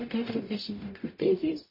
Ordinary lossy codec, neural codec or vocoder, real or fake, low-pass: MP3, 24 kbps; codec, 44.1 kHz, 0.9 kbps, DAC; fake; 5.4 kHz